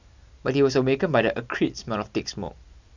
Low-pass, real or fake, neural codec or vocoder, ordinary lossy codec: 7.2 kHz; real; none; none